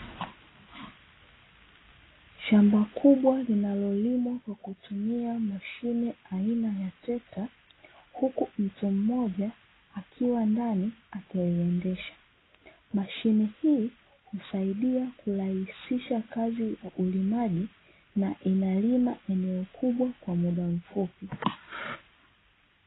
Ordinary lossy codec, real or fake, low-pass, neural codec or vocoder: AAC, 16 kbps; real; 7.2 kHz; none